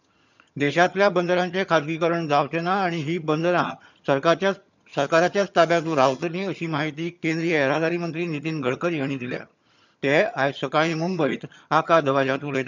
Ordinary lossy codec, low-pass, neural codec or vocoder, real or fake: none; 7.2 kHz; vocoder, 22.05 kHz, 80 mel bands, HiFi-GAN; fake